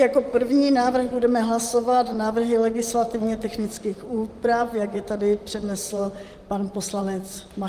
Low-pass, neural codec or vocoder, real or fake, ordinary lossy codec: 14.4 kHz; vocoder, 44.1 kHz, 128 mel bands, Pupu-Vocoder; fake; Opus, 32 kbps